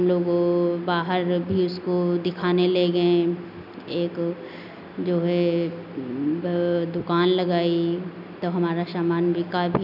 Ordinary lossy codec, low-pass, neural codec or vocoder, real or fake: none; 5.4 kHz; none; real